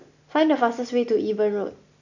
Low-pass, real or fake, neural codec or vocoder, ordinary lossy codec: 7.2 kHz; real; none; none